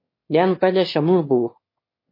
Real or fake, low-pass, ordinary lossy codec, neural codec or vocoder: fake; 5.4 kHz; MP3, 24 kbps; autoencoder, 22.05 kHz, a latent of 192 numbers a frame, VITS, trained on one speaker